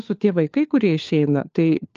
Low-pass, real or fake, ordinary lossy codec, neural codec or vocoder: 7.2 kHz; fake; Opus, 24 kbps; codec, 16 kHz, 4 kbps, FunCodec, trained on LibriTTS, 50 frames a second